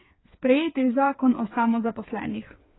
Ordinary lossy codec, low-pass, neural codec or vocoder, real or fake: AAC, 16 kbps; 7.2 kHz; codec, 16 kHz, 4 kbps, FreqCodec, smaller model; fake